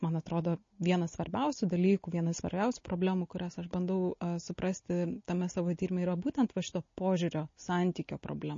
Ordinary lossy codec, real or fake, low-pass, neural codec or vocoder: MP3, 32 kbps; real; 7.2 kHz; none